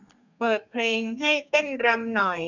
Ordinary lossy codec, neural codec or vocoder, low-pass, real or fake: none; codec, 32 kHz, 1.9 kbps, SNAC; 7.2 kHz; fake